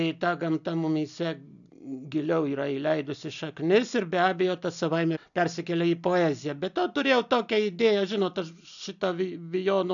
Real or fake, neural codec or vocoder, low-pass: real; none; 7.2 kHz